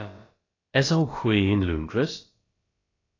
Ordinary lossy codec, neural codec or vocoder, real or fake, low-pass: AAC, 32 kbps; codec, 16 kHz, about 1 kbps, DyCAST, with the encoder's durations; fake; 7.2 kHz